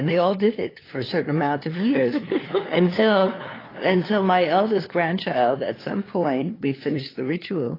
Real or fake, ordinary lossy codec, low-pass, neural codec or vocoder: fake; AAC, 24 kbps; 5.4 kHz; codec, 16 kHz, 2 kbps, FunCodec, trained on LibriTTS, 25 frames a second